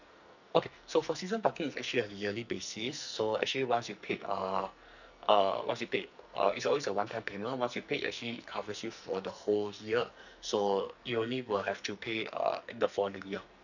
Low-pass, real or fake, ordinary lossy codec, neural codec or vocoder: 7.2 kHz; fake; none; codec, 44.1 kHz, 2.6 kbps, SNAC